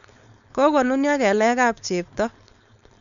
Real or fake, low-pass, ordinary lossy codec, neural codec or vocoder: fake; 7.2 kHz; none; codec, 16 kHz, 4.8 kbps, FACodec